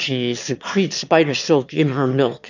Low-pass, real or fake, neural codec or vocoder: 7.2 kHz; fake; autoencoder, 22.05 kHz, a latent of 192 numbers a frame, VITS, trained on one speaker